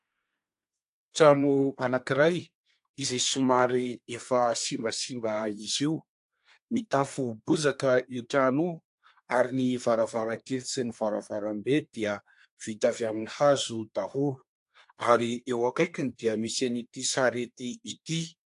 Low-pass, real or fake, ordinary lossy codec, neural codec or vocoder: 10.8 kHz; fake; AAC, 64 kbps; codec, 24 kHz, 1 kbps, SNAC